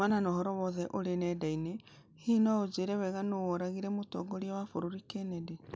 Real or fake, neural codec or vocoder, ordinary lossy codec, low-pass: real; none; none; none